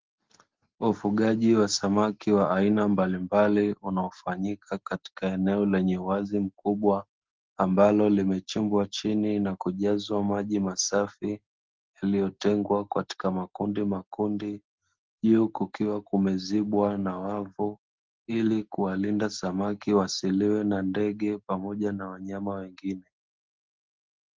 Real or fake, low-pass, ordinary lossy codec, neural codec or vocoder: real; 7.2 kHz; Opus, 16 kbps; none